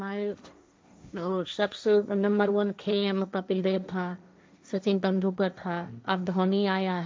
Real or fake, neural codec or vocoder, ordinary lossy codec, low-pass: fake; codec, 16 kHz, 1.1 kbps, Voila-Tokenizer; none; none